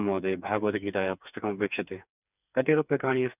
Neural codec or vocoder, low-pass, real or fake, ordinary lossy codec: codec, 16 kHz, 4 kbps, FreqCodec, smaller model; 3.6 kHz; fake; none